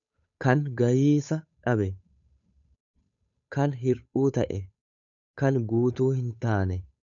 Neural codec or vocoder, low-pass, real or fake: codec, 16 kHz, 8 kbps, FunCodec, trained on Chinese and English, 25 frames a second; 7.2 kHz; fake